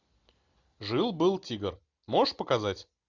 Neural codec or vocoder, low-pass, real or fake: none; 7.2 kHz; real